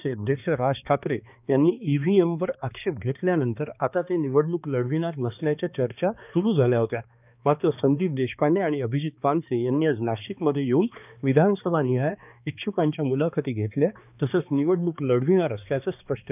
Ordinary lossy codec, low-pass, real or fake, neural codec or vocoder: none; 3.6 kHz; fake; codec, 16 kHz, 2 kbps, X-Codec, HuBERT features, trained on balanced general audio